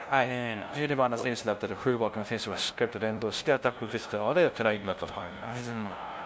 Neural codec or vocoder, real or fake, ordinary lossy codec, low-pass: codec, 16 kHz, 0.5 kbps, FunCodec, trained on LibriTTS, 25 frames a second; fake; none; none